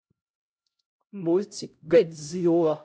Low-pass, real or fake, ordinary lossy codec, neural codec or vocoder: none; fake; none; codec, 16 kHz, 0.5 kbps, X-Codec, HuBERT features, trained on LibriSpeech